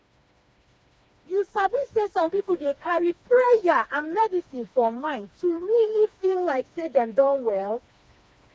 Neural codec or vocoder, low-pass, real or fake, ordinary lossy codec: codec, 16 kHz, 2 kbps, FreqCodec, smaller model; none; fake; none